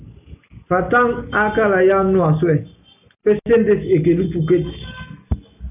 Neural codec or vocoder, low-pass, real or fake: none; 3.6 kHz; real